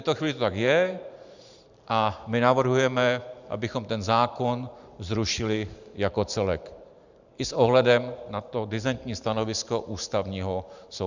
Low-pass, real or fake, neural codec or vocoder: 7.2 kHz; fake; vocoder, 44.1 kHz, 128 mel bands every 512 samples, BigVGAN v2